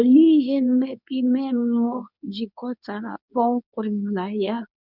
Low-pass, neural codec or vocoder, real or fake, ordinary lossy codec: 5.4 kHz; codec, 24 kHz, 0.9 kbps, WavTokenizer, medium speech release version 2; fake; none